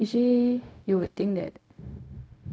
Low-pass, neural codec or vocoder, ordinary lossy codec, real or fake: none; codec, 16 kHz, 0.4 kbps, LongCat-Audio-Codec; none; fake